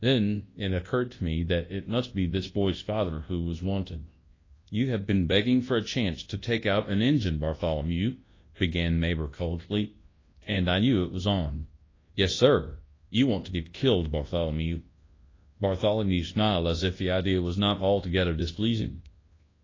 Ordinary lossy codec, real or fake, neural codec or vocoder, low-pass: AAC, 32 kbps; fake; codec, 24 kHz, 0.9 kbps, WavTokenizer, large speech release; 7.2 kHz